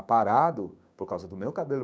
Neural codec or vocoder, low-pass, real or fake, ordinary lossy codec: codec, 16 kHz, 6 kbps, DAC; none; fake; none